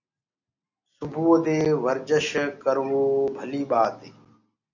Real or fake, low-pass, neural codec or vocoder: real; 7.2 kHz; none